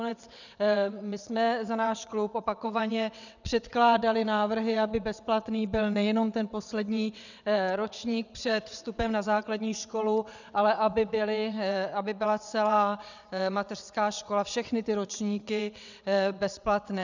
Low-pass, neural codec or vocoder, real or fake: 7.2 kHz; vocoder, 22.05 kHz, 80 mel bands, WaveNeXt; fake